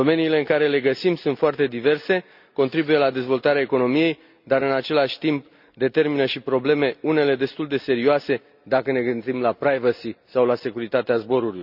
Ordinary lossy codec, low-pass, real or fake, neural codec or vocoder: none; 5.4 kHz; real; none